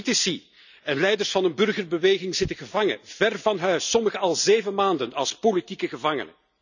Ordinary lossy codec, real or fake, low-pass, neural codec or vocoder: none; real; 7.2 kHz; none